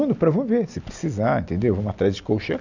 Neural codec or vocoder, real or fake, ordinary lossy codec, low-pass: none; real; none; 7.2 kHz